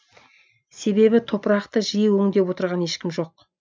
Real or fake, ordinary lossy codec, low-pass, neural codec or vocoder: real; none; none; none